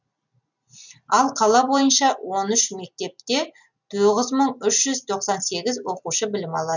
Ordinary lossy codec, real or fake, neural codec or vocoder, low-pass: none; real; none; 7.2 kHz